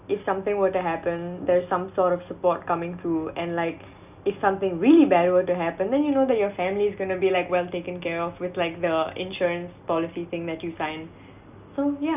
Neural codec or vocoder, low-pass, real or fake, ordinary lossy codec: none; 3.6 kHz; real; none